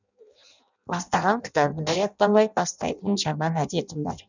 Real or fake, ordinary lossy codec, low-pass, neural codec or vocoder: fake; none; 7.2 kHz; codec, 16 kHz in and 24 kHz out, 0.6 kbps, FireRedTTS-2 codec